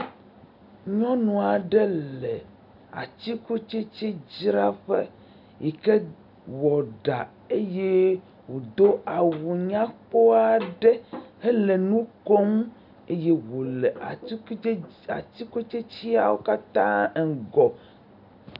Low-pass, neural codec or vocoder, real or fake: 5.4 kHz; none; real